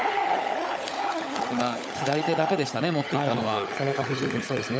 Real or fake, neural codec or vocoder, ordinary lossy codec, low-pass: fake; codec, 16 kHz, 16 kbps, FunCodec, trained on LibriTTS, 50 frames a second; none; none